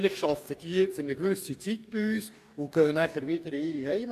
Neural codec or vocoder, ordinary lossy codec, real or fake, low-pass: codec, 44.1 kHz, 2.6 kbps, DAC; AAC, 96 kbps; fake; 14.4 kHz